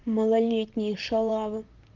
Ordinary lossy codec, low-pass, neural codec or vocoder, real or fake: Opus, 32 kbps; 7.2 kHz; vocoder, 44.1 kHz, 128 mel bands, Pupu-Vocoder; fake